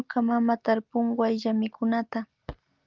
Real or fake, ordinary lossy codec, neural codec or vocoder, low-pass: real; Opus, 32 kbps; none; 7.2 kHz